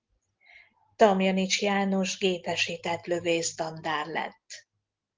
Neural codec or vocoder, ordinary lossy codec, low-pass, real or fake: none; Opus, 16 kbps; 7.2 kHz; real